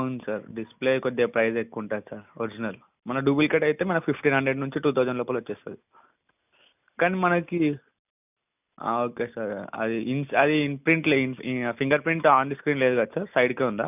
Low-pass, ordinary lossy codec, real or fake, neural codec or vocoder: 3.6 kHz; none; real; none